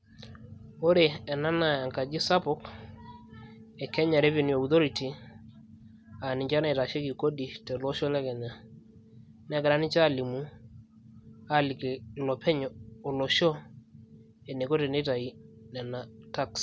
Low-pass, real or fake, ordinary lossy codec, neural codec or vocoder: none; real; none; none